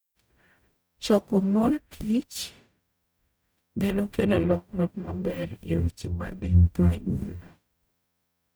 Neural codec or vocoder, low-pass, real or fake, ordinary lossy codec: codec, 44.1 kHz, 0.9 kbps, DAC; none; fake; none